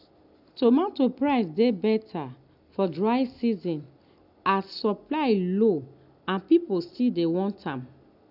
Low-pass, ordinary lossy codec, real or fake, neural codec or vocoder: 5.4 kHz; none; real; none